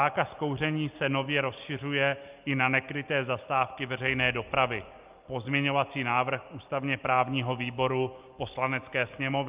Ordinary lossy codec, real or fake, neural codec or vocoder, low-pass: Opus, 24 kbps; real; none; 3.6 kHz